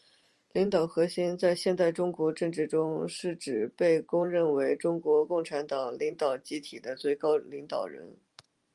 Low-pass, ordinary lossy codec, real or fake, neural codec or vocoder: 10.8 kHz; Opus, 32 kbps; fake; vocoder, 44.1 kHz, 128 mel bands every 512 samples, BigVGAN v2